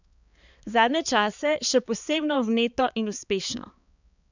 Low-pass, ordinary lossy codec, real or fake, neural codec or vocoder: 7.2 kHz; none; fake; codec, 16 kHz, 4 kbps, X-Codec, HuBERT features, trained on balanced general audio